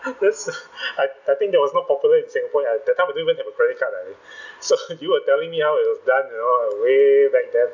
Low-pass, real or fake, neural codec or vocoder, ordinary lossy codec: 7.2 kHz; real; none; none